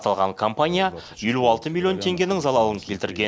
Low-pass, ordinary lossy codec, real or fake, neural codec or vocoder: none; none; real; none